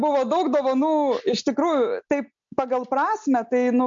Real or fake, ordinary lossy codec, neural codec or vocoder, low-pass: real; MP3, 48 kbps; none; 7.2 kHz